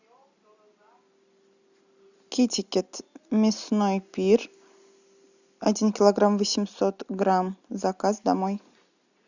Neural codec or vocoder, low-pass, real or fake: none; 7.2 kHz; real